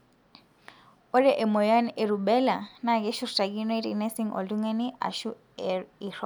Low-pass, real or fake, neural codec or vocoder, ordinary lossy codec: 19.8 kHz; real; none; none